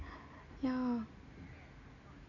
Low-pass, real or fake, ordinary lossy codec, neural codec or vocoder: 7.2 kHz; real; none; none